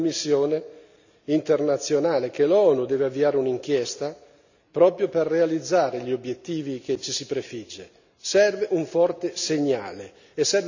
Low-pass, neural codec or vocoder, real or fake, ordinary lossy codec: 7.2 kHz; none; real; none